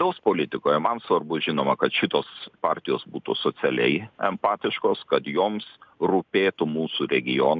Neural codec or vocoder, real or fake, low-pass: none; real; 7.2 kHz